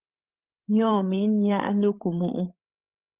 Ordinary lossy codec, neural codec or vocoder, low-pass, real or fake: Opus, 24 kbps; codec, 16 kHz, 8 kbps, FreqCodec, larger model; 3.6 kHz; fake